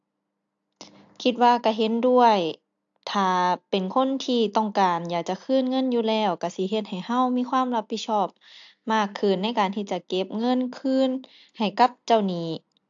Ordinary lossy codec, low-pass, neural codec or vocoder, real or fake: AAC, 64 kbps; 7.2 kHz; none; real